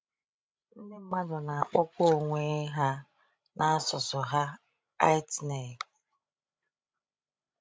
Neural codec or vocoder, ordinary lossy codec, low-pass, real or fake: codec, 16 kHz, 16 kbps, FreqCodec, larger model; none; none; fake